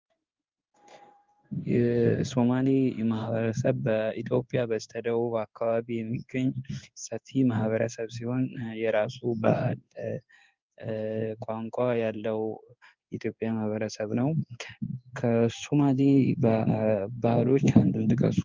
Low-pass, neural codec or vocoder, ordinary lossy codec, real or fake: 7.2 kHz; codec, 16 kHz in and 24 kHz out, 1 kbps, XY-Tokenizer; Opus, 24 kbps; fake